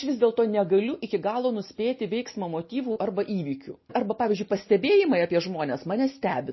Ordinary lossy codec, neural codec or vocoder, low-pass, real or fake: MP3, 24 kbps; none; 7.2 kHz; real